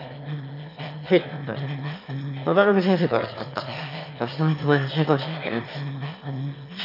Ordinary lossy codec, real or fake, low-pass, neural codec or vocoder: none; fake; 5.4 kHz; autoencoder, 22.05 kHz, a latent of 192 numbers a frame, VITS, trained on one speaker